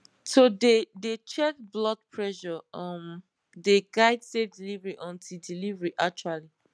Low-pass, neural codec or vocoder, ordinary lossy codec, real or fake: none; none; none; real